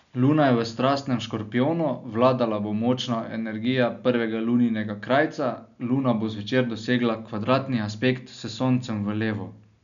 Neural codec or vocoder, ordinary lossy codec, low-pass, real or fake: none; none; 7.2 kHz; real